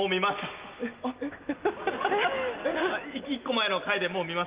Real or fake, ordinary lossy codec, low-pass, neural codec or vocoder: real; Opus, 32 kbps; 3.6 kHz; none